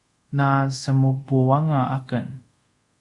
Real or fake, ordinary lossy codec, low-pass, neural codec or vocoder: fake; Opus, 64 kbps; 10.8 kHz; codec, 24 kHz, 0.5 kbps, DualCodec